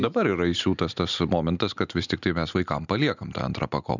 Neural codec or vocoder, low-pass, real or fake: none; 7.2 kHz; real